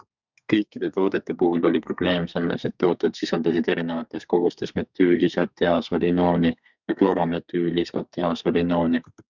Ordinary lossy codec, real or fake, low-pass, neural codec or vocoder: Opus, 64 kbps; fake; 7.2 kHz; codec, 32 kHz, 1.9 kbps, SNAC